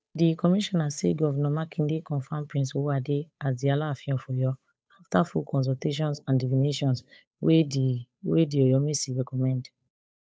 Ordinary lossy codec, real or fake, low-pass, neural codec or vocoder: none; fake; none; codec, 16 kHz, 8 kbps, FunCodec, trained on Chinese and English, 25 frames a second